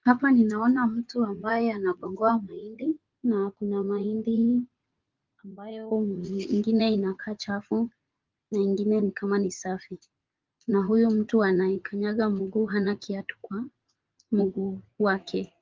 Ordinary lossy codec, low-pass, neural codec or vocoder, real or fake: Opus, 32 kbps; 7.2 kHz; vocoder, 44.1 kHz, 80 mel bands, Vocos; fake